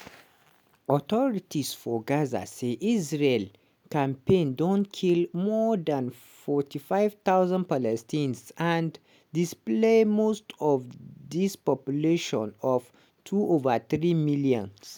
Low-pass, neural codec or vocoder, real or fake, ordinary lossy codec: none; none; real; none